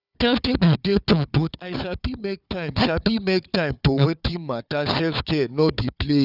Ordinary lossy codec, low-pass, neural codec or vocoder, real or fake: none; 5.4 kHz; codec, 16 kHz, 4 kbps, FunCodec, trained on Chinese and English, 50 frames a second; fake